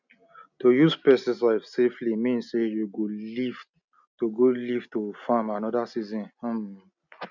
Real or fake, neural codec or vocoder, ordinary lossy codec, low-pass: real; none; none; 7.2 kHz